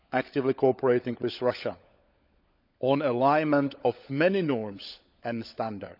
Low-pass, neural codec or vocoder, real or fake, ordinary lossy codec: 5.4 kHz; codec, 16 kHz, 16 kbps, FunCodec, trained on LibriTTS, 50 frames a second; fake; none